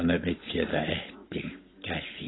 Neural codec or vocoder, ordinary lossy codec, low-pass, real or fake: none; AAC, 16 kbps; 7.2 kHz; real